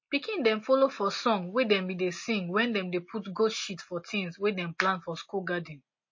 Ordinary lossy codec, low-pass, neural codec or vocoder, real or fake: MP3, 32 kbps; 7.2 kHz; none; real